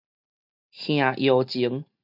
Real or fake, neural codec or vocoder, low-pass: real; none; 5.4 kHz